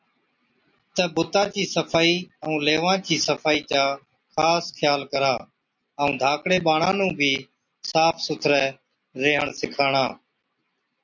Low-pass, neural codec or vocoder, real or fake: 7.2 kHz; none; real